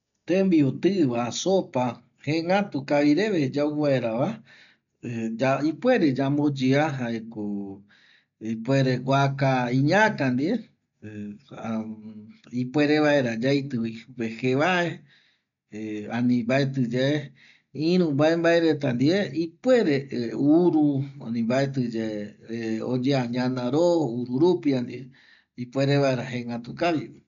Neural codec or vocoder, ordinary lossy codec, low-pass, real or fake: none; MP3, 96 kbps; 7.2 kHz; real